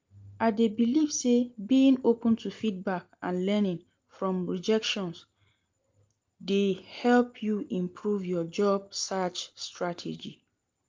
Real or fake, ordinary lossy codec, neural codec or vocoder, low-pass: real; Opus, 32 kbps; none; 7.2 kHz